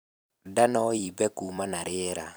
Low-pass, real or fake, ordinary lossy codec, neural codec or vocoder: none; fake; none; vocoder, 44.1 kHz, 128 mel bands every 256 samples, BigVGAN v2